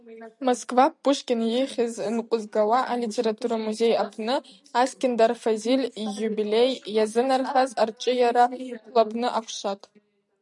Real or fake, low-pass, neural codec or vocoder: real; 10.8 kHz; none